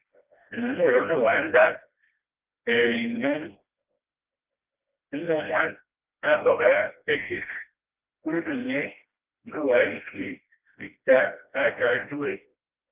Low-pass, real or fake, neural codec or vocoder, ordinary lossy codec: 3.6 kHz; fake; codec, 16 kHz, 1 kbps, FreqCodec, smaller model; Opus, 24 kbps